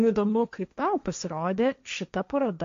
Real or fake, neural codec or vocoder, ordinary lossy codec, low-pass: fake; codec, 16 kHz, 1.1 kbps, Voila-Tokenizer; MP3, 48 kbps; 7.2 kHz